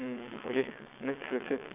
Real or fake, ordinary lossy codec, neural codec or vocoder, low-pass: fake; none; vocoder, 22.05 kHz, 80 mel bands, WaveNeXt; 3.6 kHz